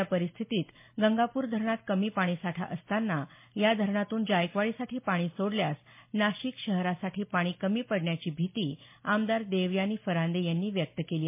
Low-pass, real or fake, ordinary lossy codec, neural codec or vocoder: 3.6 kHz; real; MP3, 24 kbps; none